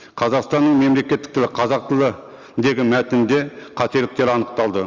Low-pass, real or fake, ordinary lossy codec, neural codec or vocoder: 7.2 kHz; real; Opus, 32 kbps; none